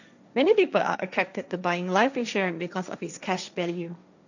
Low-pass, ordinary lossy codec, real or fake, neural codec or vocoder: 7.2 kHz; none; fake; codec, 16 kHz, 1.1 kbps, Voila-Tokenizer